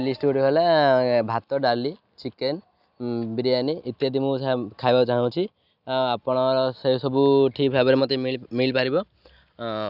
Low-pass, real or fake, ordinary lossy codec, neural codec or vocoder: 5.4 kHz; real; none; none